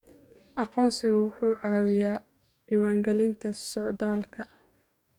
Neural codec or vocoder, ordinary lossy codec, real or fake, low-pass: codec, 44.1 kHz, 2.6 kbps, DAC; none; fake; 19.8 kHz